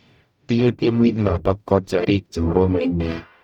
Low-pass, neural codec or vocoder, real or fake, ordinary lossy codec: 19.8 kHz; codec, 44.1 kHz, 0.9 kbps, DAC; fake; none